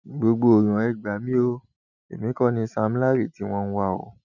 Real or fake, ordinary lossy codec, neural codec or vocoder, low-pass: real; none; none; 7.2 kHz